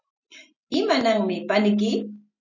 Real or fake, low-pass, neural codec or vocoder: real; 7.2 kHz; none